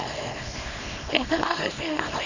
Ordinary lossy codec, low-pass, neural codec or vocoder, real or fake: Opus, 64 kbps; 7.2 kHz; codec, 24 kHz, 0.9 kbps, WavTokenizer, small release; fake